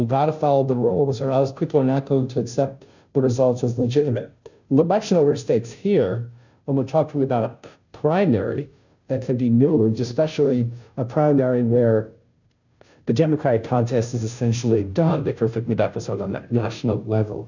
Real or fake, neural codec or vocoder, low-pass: fake; codec, 16 kHz, 0.5 kbps, FunCodec, trained on Chinese and English, 25 frames a second; 7.2 kHz